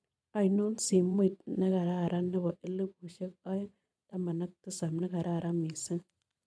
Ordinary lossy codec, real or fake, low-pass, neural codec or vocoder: none; fake; none; vocoder, 22.05 kHz, 80 mel bands, Vocos